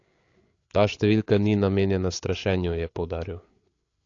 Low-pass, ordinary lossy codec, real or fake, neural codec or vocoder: 7.2 kHz; AAC, 48 kbps; fake; codec, 16 kHz, 8 kbps, FreqCodec, larger model